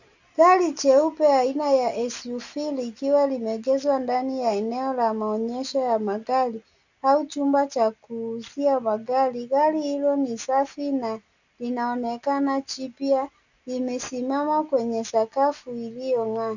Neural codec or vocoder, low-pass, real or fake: none; 7.2 kHz; real